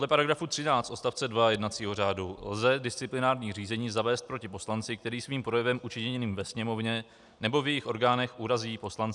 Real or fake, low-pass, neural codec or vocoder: real; 10.8 kHz; none